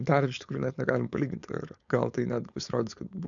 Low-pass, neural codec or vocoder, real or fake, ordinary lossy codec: 7.2 kHz; codec, 16 kHz, 4.8 kbps, FACodec; fake; MP3, 96 kbps